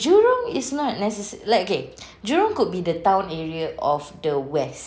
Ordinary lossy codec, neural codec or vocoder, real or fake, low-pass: none; none; real; none